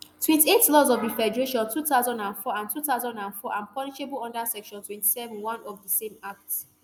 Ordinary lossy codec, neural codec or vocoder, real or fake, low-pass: none; none; real; none